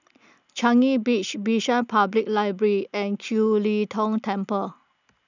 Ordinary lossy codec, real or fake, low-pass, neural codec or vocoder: none; real; 7.2 kHz; none